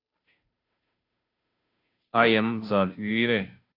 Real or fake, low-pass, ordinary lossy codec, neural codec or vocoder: fake; 5.4 kHz; AAC, 48 kbps; codec, 16 kHz, 0.5 kbps, FunCodec, trained on Chinese and English, 25 frames a second